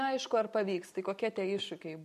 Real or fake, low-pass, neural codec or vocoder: real; 14.4 kHz; none